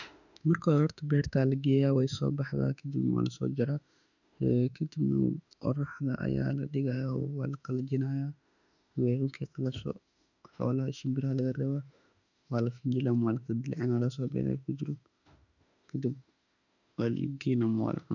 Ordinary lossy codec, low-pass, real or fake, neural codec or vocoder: none; 7.2 kHz; fake; autoencoder, 48 kHz, 32 numbers a frame, DAC-VAE, trained on Japanese speech